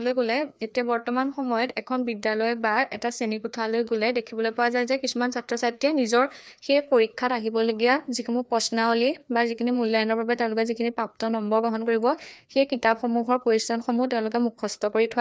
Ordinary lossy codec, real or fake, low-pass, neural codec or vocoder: none; fake; none; codec, 16 kHz, 2 kbps, FreqCodec, larger model